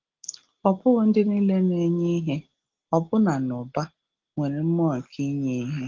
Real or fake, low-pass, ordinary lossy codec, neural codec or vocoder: real; 7.2 kHz; Opus, 16 kbps; none